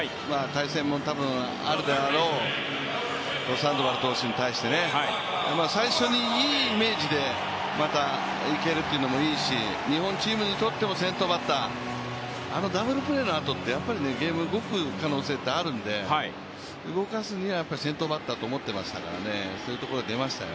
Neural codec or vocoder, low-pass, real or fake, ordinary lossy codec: none; none; real; none